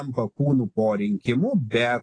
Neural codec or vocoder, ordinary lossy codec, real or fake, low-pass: none; AAC, 32 kbps; real; 9.9 kHz